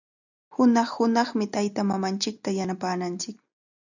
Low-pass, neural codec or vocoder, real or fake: 7.2 kHz; none; real